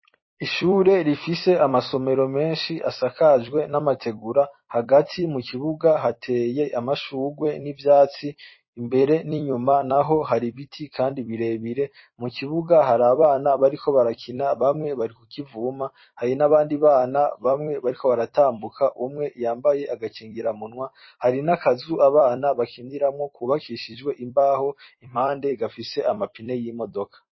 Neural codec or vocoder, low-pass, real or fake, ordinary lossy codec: vocoder, 44.1 kHz, 128 mel bands every 256 samples, BigVGAN v2; 7.2 kHz; fake; MP3, 24 kbps